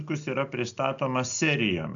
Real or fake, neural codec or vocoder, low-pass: real; none; 7.2 kHz